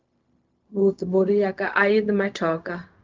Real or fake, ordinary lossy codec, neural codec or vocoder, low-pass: fake; Opus, 24 kbps; codec, 16 kHz, 0.4 kbps, LongCat-Audio-Codec; 7.2 kHz